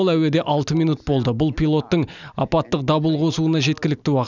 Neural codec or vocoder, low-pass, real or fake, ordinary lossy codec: none; 7.2 kHz; real; none